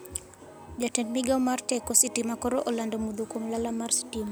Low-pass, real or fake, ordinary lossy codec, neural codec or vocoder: none; real; none; none